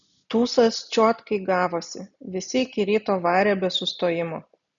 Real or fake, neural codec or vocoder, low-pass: real; none; 7.2 kHz